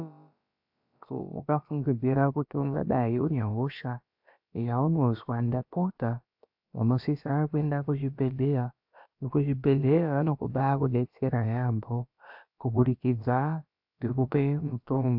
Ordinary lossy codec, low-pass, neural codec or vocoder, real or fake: AAC, 48 kbps; 5.4 kHz; codec, 16 kHz, about 1 kbps, DyCAST, with the encoder's durations; fake